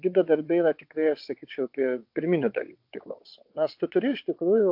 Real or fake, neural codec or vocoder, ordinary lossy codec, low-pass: fake; vocoder, 22.05 kHz, 80 mel bands, WaveNeXt; MP3, 48 kbps; 5.4 kHz